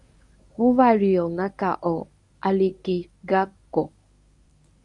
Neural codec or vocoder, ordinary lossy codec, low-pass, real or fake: codec, 24 kHz, 0.9 kbps, WavTokenizer, medium speech release version 1; AAC, 64 kbps; 10.8 kHz; fake